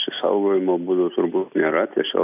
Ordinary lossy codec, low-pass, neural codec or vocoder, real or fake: AAC, 32 kbps; 3.6 kHz; none; real